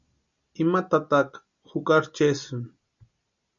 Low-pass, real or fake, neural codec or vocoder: 7.2 kHz; real; none